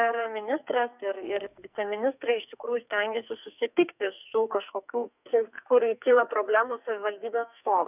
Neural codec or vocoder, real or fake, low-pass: codec, 44.1 kHz, 2.6 kbps, SNAC; fake; 3.6 kHz